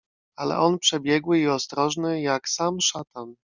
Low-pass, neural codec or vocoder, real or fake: 7.2 kHz; none; real